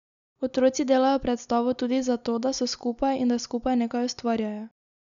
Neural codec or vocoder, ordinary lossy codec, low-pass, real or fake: none; none; 7.2 kHz; real